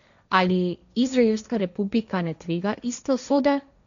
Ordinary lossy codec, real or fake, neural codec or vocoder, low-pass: none; fake; codec, 16 kHz, 1.1 kbps, Voila-Tokenizer; 7.2 kHz